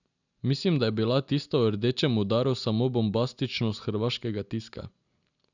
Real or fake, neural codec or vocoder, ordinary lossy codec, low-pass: real; none; none; 7.2 kHz